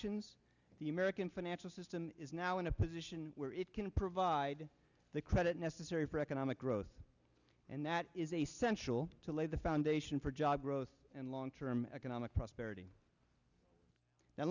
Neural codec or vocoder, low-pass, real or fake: none; 7.2 kHz; real